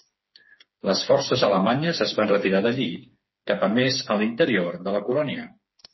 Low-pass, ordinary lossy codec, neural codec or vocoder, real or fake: 7.2 kHz; MP3, 24 kbps; codec, 16 kHz, 4 kbps, FreqCodec, smaller model; fake